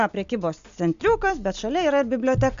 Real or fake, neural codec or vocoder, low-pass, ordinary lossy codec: real; none; 7.2 kHz; MP3, 64 kbps